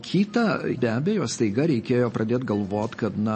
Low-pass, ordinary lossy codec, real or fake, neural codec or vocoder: 10.8 kHz; MP3, 32 kbps; real; none